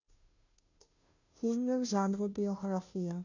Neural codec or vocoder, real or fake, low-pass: codec, 16 kHz, 0.5 kbps, FunCodec, trained on Chinese and English, 25 frames a second; fake; 7.2 kHz